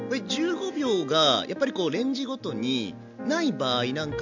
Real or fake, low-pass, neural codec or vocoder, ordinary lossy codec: real; 7.2 kHz; none; none